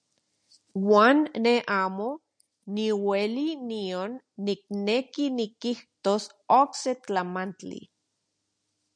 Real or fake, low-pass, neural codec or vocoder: real; 9.9 kHz; none